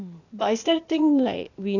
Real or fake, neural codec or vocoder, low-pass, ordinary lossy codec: fake; codec, 16 kHz, 0.8 kbps, ZipCodec; 7.2 kHz; none